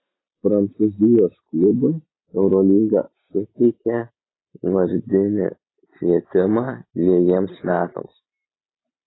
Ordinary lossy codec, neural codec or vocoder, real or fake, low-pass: AAC, 16 kbps; none; real; 7.2 kHz